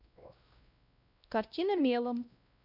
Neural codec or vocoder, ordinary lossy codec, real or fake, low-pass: codec, 16 kHz, 1 kbps, X-Codec, WavLM features, trained on Multilingual LibriSpeech; none; fake; 5.4 kHz